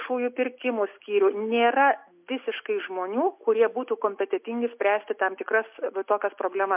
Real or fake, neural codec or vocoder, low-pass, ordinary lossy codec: real; none; 3.6 kHz; MP3, 32 kbps